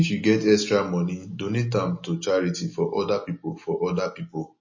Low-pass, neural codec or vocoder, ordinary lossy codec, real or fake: 7.2 kHz; none; MP3, 32 kbps; real